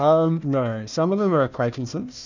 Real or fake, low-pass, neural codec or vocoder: fake; 7.2 kHz; codec, 16 kHz, 1 kbps, FunCodec, trained on Chinese and English, 50 frames a second